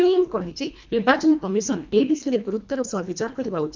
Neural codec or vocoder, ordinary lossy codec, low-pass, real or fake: codec, 24 kHz, 1.5 kbps, HILCodec; MP3, 64 kbps; 7.2 kHz; fake